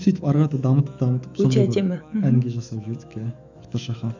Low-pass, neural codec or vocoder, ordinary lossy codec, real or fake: 7.2 kHz; autoencoder, 48 kHz, 128 numbers a frame, DAC-VAE, trained on Japanese speech; none; fake